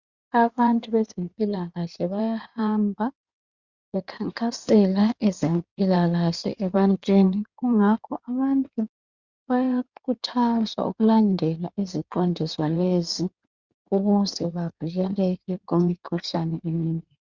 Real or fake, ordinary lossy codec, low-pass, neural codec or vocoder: fake; Opus, 64 kbps; 7.2 kHz; codec, 16 kHz in and 24 kHz out, 2.2 kbps, FireRedTTS-2 codec